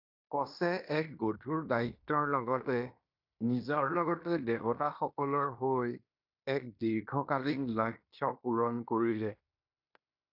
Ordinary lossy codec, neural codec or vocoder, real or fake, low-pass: AAC, 48 kbps; codec, 16 kHz in and 24 kHz out, 0.9 kbps, LongCat-Audio-Codec, fine tuned four codebook decoder; fake; 5.4 kHz